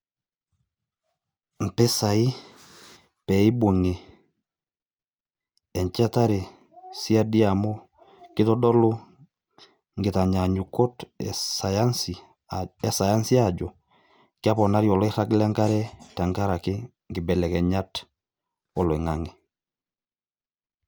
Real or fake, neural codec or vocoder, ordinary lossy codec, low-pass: real; none; none; none